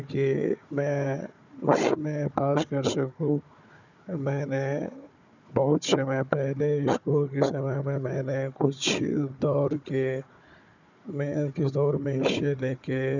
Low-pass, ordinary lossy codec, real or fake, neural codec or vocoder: 7.2 kHz; none; fake; codec, 16 kHz, 4 kbps, FunCodec, trained on Chinese and English, 50 frames a second